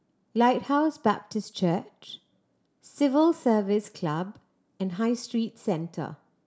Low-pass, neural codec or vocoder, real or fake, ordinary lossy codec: none; none; real; none